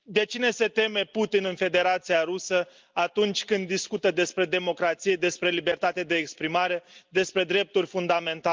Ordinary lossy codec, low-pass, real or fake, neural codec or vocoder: Opus, 32 kbps; 7.2 kHz; real; none